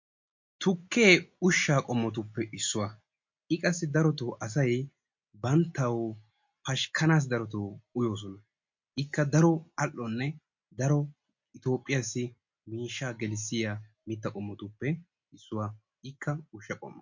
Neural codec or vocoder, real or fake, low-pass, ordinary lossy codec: none; real; 7.2 kHz; MP3, 48 kbps